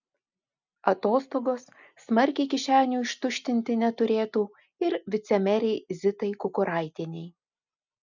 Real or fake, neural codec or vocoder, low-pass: real; none; 7.2 kHz